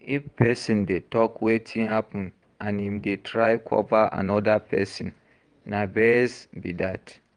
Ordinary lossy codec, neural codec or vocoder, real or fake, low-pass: Opus, 32 kbps; vocoder, 22.05 kHz, 80 mel bands, WaveNeXt; fake; 9.9 kHz